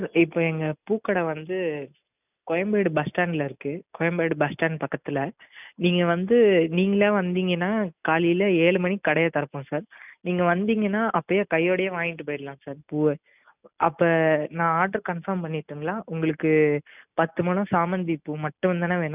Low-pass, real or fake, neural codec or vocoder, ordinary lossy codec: 3.6 kHz; real; none; none